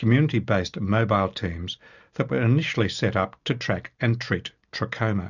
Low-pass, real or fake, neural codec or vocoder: 7.2 kHz; real; none